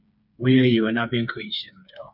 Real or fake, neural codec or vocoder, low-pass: fake; codec, 16 kHz, 4 kbps, FreqCodec, smaller model; 5.4 kHz